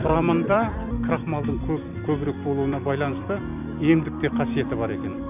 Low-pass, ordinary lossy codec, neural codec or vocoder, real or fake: 3.6 kHz; none; vocoder, 44.1 kHz, 128 mel bands every 256 samples, BigVGAN v2; fake